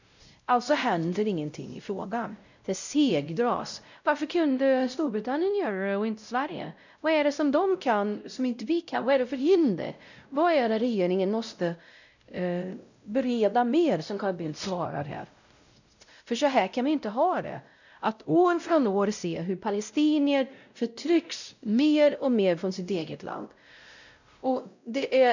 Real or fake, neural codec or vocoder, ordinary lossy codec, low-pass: fake; codec, 16 kHz, 0.5 kbps, X-Codec, WavLM features, trained on Multilingual LibriSpeech; none; 7.2 kHz